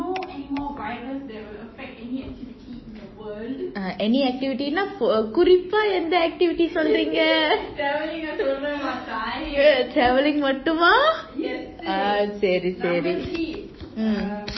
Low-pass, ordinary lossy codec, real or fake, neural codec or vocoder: 7.2 kHz; MP3, 24 kbps; fake; autoencoder, 48 kHz, 128 numbers a frame, DAC-VAE, trained on Japanese speech